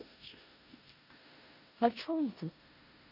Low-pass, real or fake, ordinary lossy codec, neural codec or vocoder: 5.4 kHz; fake; none; codec, 16 kHz in and 24 kHz out, 0.4 kbps, LongCat-Audio-Codec, four codebook decoder